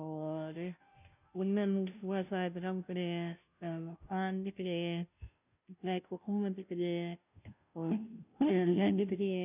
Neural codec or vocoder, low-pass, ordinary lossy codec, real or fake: codec, 16 kHz, 0.5 kbps, FunCodec, trained on Chinese and English, 25 frames a second; 3.6 kHz; none; fake